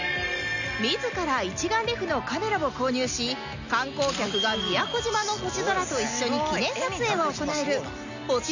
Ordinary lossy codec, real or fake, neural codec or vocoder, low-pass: none; real; none; 7.2 kHz